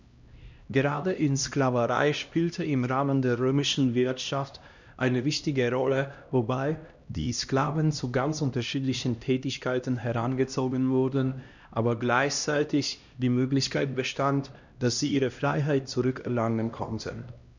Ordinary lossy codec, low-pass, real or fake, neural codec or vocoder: none; 7.2 kHz; fake; codec, 16 kHz, 1 kbps, X-Codec, HuBERT features, trained on LibriSpeech